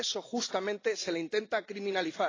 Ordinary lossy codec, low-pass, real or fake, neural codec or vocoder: AAC, 32 kbps; 7.2 kHz; real; none